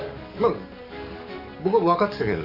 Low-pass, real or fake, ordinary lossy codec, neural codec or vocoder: 5.4 kHz; fake; none; codec, 44.1 kHz, 7.8 kbps, DAC